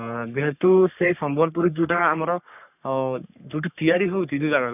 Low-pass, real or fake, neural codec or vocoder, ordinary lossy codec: 3.6 kHz; fake; codec, 44.1 kHz, 3.4 kbps, Pupu-Codec; none